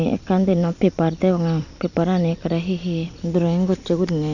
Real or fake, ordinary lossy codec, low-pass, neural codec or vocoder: fake; none; 7.2 kHz; vocoder, 44.1 kHz, 128 mel bands every 256 samples, BigVGAN v2